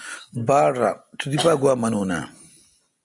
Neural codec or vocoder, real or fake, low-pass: none; real; 10.8 kHz